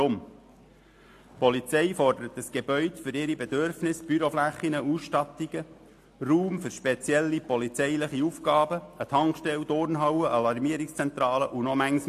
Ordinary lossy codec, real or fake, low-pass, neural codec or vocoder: AAC, 64 kbps; real; 14.4 kHz; none